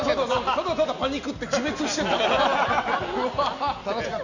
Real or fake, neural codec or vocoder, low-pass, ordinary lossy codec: real; none; 7.2 kHz; none